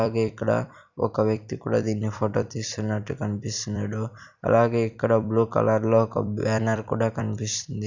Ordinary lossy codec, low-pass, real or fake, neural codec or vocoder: none; 7.2 kHz; fake; vocoder, 44.1 kHz, 128 mel bands every 256 samples, BigVGAN v2